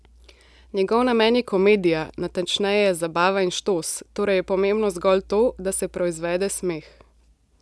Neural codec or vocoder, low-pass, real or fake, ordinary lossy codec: none; none; real; none